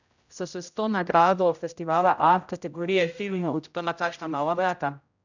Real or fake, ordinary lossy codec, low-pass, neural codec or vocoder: fake; none; 7.2 kHz; codec, 16 kHz, 0.5 kbps, X-Codec, HuBERT features, trained on general audio